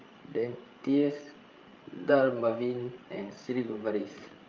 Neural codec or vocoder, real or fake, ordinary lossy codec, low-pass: codec, 16 kHz, 16 kbps, FreqCodec, larger model; fake; Opus, 32 kbps; 7.2 kHz